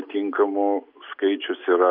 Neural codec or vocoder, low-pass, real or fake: none; 5.4 kHz; real